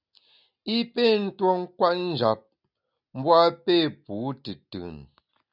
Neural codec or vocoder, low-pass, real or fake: none; 5.4 kHz; real